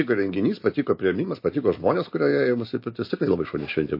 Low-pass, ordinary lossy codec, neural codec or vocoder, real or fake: 5.4 kHz; MP3, 32 kbps; none; real